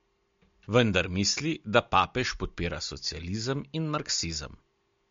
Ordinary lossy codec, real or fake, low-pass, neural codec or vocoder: MP3, 48 kbps; real; 7.2 kHz; none